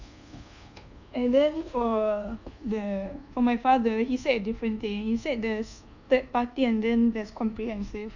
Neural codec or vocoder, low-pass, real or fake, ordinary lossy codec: codec, 24 kHz, 1.2 kbps, DualCodec; 7.2 kHz; fake; none